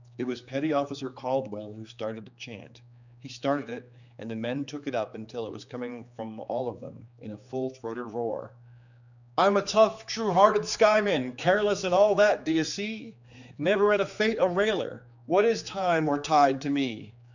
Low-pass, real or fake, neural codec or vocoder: 7.2 kHz; fake; codec, 16 kHz, 4 kbps, X-Codec, HuBERT features, trained on general audio